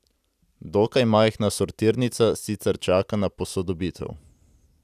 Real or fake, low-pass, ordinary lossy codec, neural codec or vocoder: real; 14.4 kHz; none; none